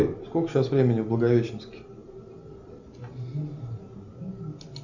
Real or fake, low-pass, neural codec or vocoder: real; 7.2 kHz; none